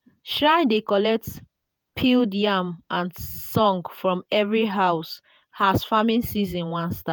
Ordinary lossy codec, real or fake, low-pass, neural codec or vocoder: none; fake; none; vocoder, 48 kHz, 128 mel bands, Vocos